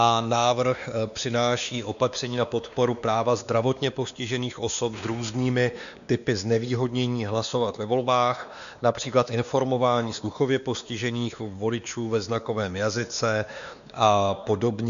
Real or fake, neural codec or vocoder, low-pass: fake; codec, 16 kHz, 2 kbps, X-Codec, WavLM features, trained on Multilingual LibriSpeech; 7.2 kHz